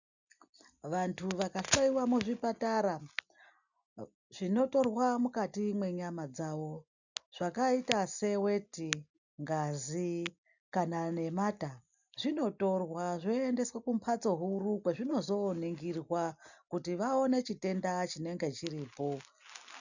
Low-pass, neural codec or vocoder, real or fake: 7.2 kHz; none; real